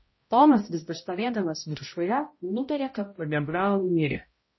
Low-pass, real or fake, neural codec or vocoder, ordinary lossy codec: 7.2 kHz; fake; codec, 16 kHz, 0.5 kbps, X-Codec, HuBERT features, trained on balanced general audio; MP3, 24 kbps